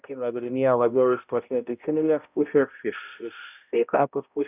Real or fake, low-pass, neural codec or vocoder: fake; 3.6 kHz; codec, 16 kHz, 0.5 kbps, X-Codec, HuBERT features, trained on balanced general audio